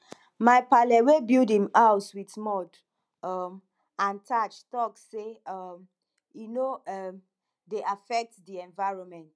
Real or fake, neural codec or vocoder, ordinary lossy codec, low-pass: real; none; none; none